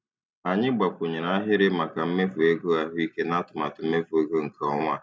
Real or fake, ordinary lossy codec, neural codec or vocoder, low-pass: real; none; none; none